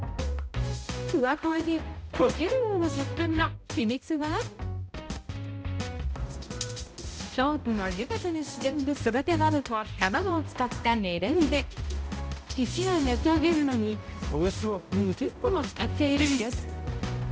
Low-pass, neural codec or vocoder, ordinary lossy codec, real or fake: none; codec, 16 kHz, 0.5 kbps, X-Codec, HuBERT features, trained on balanced general audio; none; fake